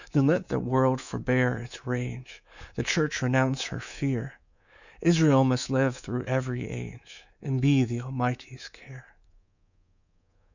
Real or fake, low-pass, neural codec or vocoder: fake; 7.2 kHz; codec, 24 kHz, 3.1 kbps, DualCodec